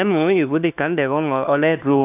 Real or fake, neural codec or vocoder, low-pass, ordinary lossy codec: fake; codec, 16 kHz, 1 kbps, FunCodec, trained on LibriTTS, 50 frames a second; 3.6 kHz; AAC, 24 kbps